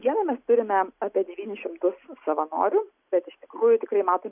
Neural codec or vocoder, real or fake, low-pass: none; real; 3.6 kHz